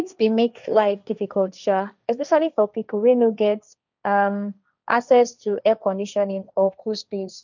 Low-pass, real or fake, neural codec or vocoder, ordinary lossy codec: 7.2 kHz; fake; codec, 16 kHz, 1.1 kbps, Voila-Tokenizer; none